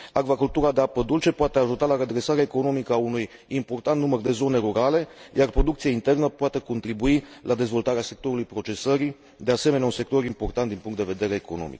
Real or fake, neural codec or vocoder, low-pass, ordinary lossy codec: real; none; none; none